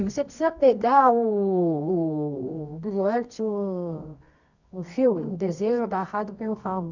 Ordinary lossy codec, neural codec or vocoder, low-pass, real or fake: none; codec, 24 kHz, 0.9 kbps, WavTokenizer, medium music audio release; 7.2 kHz; fake